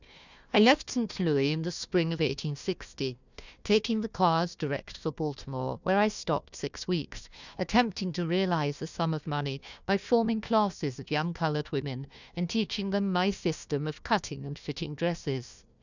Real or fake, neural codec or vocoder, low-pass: fake; codec, 16 kHz, 1 kbps, FunCodec, trained on Chinese and English, 50 frames a second; 7.2 kHz